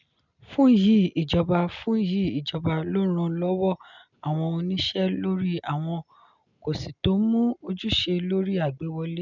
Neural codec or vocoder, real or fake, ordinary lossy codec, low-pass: none; real; none; 7.2 kHz